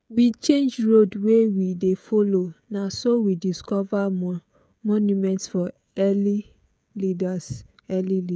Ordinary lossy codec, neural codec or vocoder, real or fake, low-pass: none; codec, 16 kHz, 16 kbps, FreqCodec, smaller model; fake; none